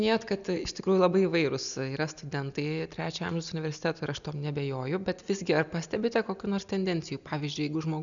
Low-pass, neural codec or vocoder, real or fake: 7.2 kHz; none; real